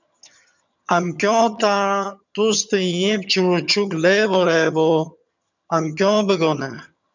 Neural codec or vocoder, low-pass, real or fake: vocoder, 22.05 kHz, 80 mel bands, HiFi-GAN; 7.2 kHz; fake